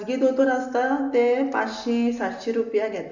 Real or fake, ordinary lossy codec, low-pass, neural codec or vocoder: real; none; 7.2 kHz; none